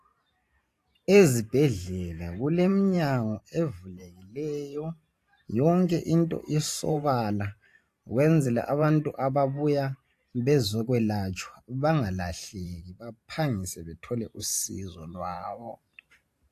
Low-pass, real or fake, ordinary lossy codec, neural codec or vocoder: 14.4 kHz; fake; AAC, 64 kbps; vocoder, 44.1 kHz, 128 mel bands every 512 samples, BigVGAN v2